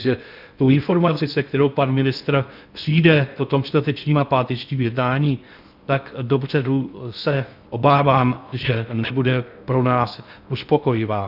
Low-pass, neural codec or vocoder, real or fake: 5.4 kHz; codec, 16 kHz in and 24 kHz out, 0.6 kbps, FocalCodec, streaming, 2048 codes; fake